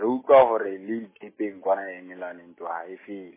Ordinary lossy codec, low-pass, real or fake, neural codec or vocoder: MP3, 16 kbps; 3.6 kHz; real; none